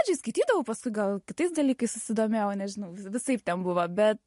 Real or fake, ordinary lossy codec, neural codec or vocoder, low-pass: real; MP3, 48 kbps; none; 14.4 kHz